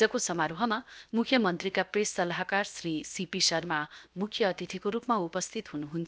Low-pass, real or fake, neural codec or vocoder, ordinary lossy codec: none; fake; codec, 16 kHz, 0.7 kbps, FocalCodec; none